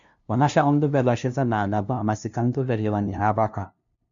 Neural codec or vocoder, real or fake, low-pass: codec, 16 kHz, 0.5 kbps, FunCodec, trained on LibriTTS, 25 frames a second; fake; 7.2 kHz